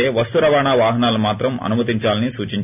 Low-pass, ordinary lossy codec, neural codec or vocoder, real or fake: 3.6 kHz; none; none; real